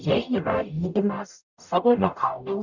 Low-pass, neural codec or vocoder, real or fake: 7.2 kHz; codec, 44.1 kHz, 0.9 kbps, DAC; fake